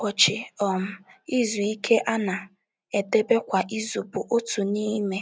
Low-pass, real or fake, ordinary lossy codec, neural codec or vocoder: none; real; none; none